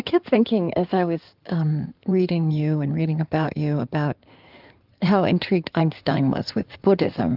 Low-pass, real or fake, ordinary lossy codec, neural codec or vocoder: 5.4 kHz; fake; Opus, 24 kbps; codec, 16 kHz in and 24 kHz out, 2.2 kbps, FireRedTTS-2 codec